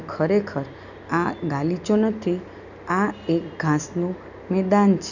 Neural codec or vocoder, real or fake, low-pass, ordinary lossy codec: none; real; 7.2 kHz; none